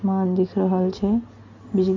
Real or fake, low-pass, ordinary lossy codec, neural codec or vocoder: real; 7.2 kHz; MP3, 48 kbps; none